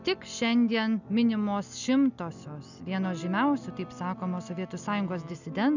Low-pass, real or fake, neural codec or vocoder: 7.2 kHz; real; none